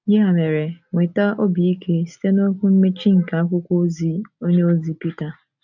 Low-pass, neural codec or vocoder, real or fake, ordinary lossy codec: 7.2 kHz; none; real; none